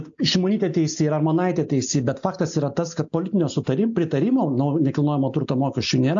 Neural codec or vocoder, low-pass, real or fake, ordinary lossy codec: none; 7.2 kHz; real; AAC, 64 kbps